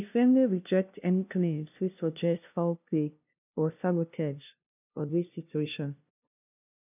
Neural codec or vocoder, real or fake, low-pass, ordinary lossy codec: codec, 16 kHz, 0.5 kbps, FunCodec, trained on LibriTTS, 25 frames a second; fake; 3.6 kHz; none